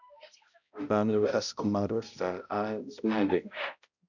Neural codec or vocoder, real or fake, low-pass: codec, 16 kHz, 0.5 kbps, X-Codec, HuBERT features, trained on balanced general audio; fake; 7.2 kHz